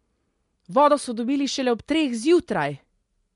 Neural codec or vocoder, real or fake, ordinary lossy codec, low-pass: none; real; MP3, 64 kbps; 10.8 kHz